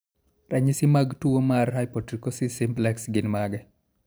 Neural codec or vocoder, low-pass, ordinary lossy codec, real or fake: vocoder, 44.1 kHz, 128 mel bands every 512 samples, BigVGAN v2; none; none; fake